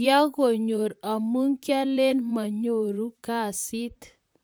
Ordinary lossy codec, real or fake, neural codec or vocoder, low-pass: none; fake; vocoder, 44.1 kHz, 128 mel bands, Pupu-Vocoder; none